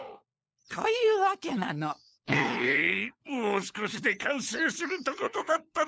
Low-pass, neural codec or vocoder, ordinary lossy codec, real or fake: none; codec, 16 kHz, 4 kbps, FunCodec, trained on LibriTTS, 50 frames a second; none; fake